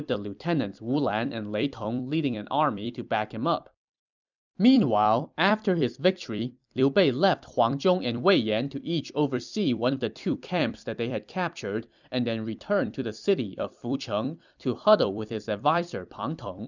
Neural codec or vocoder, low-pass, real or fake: vocoder, 44.1 kHz, 128 mel bands every 256 samples, BigVGAN v2; 7.2 kHz; fake